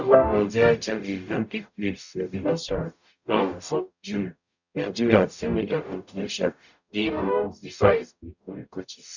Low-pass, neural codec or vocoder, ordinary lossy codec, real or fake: 7.2 kHz; codec, 44.1 kHz, 0.9 kbps, DAC; none; fake